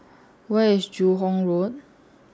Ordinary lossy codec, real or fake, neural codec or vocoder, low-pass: none; real; none; none